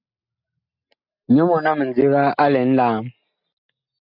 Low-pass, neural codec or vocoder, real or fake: 5.4 kHz; none; real